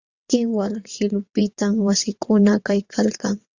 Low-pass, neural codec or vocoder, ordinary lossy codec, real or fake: 7.2 kHz; vocoder, 22.05 kHz, 80 mel bands, WaveNeXt; Opus, 64 kbps; fake